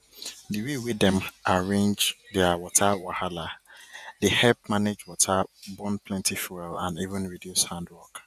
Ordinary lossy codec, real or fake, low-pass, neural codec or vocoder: none; fake; 14.4 kHz; vocoder, 48 kHz, 128 mel bands, Vocos